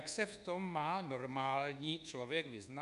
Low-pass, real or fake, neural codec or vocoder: 10.8 kHz; fake; codec, 24 kHz, 1.2 kbps, DualCodec